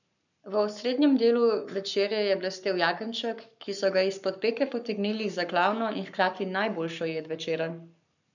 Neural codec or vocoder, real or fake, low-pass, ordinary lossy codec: codec, 44.1 kHz, 7.8 kbps, Pupu-Codec; fake; 7.2 kHz; none